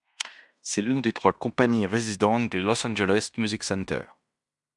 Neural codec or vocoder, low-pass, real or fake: codec, 16 kHz in and 24 kHz out, 0.9 kbps, LongCat-Audio-Codec, fine tuned four codebook decoder; 10.8 kHz; fake